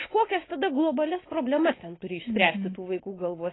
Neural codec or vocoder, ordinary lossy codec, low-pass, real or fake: none; AAC, 16 kbps; 7.2 kHz; real